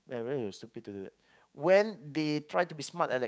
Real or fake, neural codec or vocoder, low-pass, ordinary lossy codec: fake; codec, 16 kHz, 6 kbps, DAC; none; none